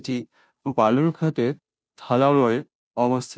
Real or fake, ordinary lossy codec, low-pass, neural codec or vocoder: fake; none; none; codec, 16 kHz, 0.5 kbps, FunCodec, trained on Chinese and English, 25 frames a second